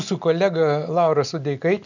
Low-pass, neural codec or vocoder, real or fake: 7.2 kHz; none; real